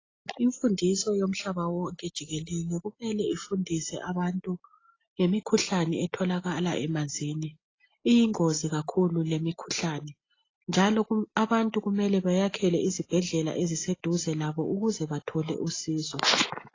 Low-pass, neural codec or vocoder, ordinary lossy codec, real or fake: 7.2 kHz; none; AAC, 32 kbps; real